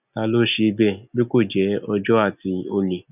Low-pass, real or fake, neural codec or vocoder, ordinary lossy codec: 3.6 kHz; real; none; none